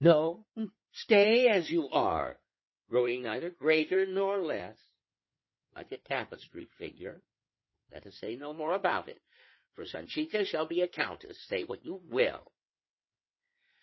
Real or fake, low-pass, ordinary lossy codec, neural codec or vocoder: fake; 7.2 kHz; MP3, 24 kbps; codec, 16 kHz in and 24 kHz out, 2.2 kbps, FireRedTTS-2 codec